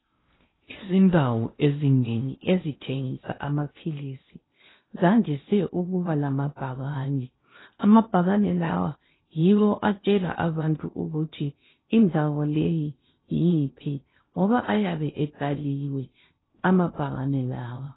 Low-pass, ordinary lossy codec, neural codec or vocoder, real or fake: 7.2 kHz; AAC, 16 kbps; codec, 16 kHz in and 24 kHz out, 0.6 kbps, FocalCodec, streaming, 4096 codes; fake